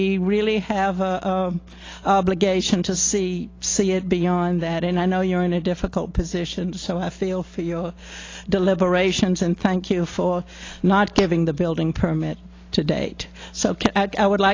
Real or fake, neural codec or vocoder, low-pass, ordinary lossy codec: real; none; 7.2 kHz; AAC, 32 kbps